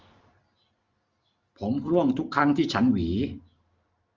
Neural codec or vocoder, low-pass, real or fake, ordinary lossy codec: none; 7.2 kHz; real; Opus, 32 kbps